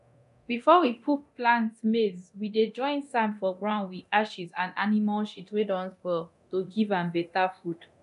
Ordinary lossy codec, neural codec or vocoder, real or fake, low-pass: none; codec, 24 kHz, 0.9 kbps, DualCodec; fake; 10.8 kHz